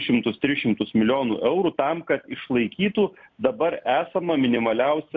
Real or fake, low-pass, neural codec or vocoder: real; 7.2 kHz; none